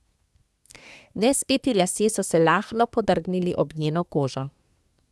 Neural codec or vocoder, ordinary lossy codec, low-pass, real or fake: codec, 24 kHz, 1 kbps, SNAC; none; none; fake